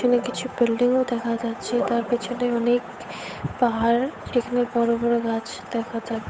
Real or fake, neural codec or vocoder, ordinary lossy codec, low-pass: fake; codec, 16 kHz, 8 kbps, FunCodec, trained on Chinese and English, 25 frames a second; none; none